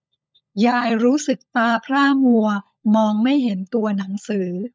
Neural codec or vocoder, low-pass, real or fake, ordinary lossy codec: codec, 16 kHz, 16 kbps, FunCodec, trained on LibriTTS, 50 frames a second; none; fake; none